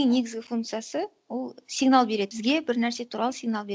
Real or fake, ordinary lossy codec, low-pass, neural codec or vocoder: real; none; none; none